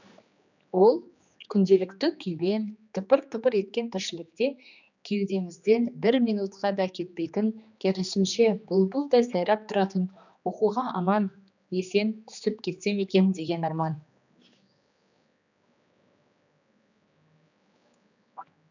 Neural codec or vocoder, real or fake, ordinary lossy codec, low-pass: codec, 16 kHz, 2 kbps, X-Codec, HuBERT features, trained on general audio; fake; none; 7.2 kHz